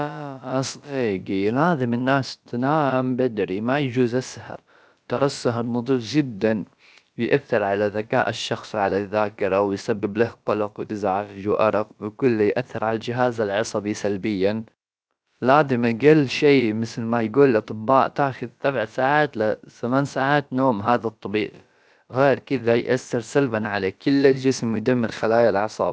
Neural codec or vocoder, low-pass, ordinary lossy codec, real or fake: codec, 16 kHz, about 1 kbps, DyCAST, with the encoder's durations; none; none; fake